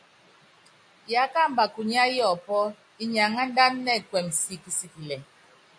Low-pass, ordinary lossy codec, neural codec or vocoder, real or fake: 9.9 kHz; AAC, 64 kbps; none; real